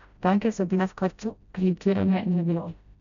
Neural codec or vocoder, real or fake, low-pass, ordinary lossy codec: codec, 16 kHz, 0.5 kbps, FreqCodec, smaller model; fake; 7.2 kHz; none